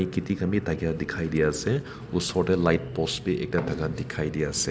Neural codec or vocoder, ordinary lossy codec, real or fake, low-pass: none; none; real; none